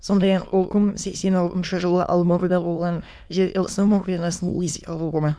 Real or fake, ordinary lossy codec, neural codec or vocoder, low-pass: fake; none; autoencoder, 22.05 kHz, a latent of 192 numbers a frame, VITS, trained on many speakers; none